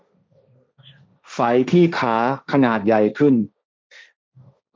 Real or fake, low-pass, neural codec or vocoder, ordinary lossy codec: fake; none; codec, 16 kHz, 1.1 kbps, Voila-Tokenizer; none